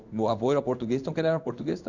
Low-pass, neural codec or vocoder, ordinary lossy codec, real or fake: 7.2 kHz; codec, 16 kHz in and 24 kHz out, 1 kbps, XY-Tokenizer; none; fake